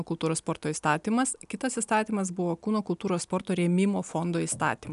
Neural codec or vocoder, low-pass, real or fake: none; 10.8 kHz; real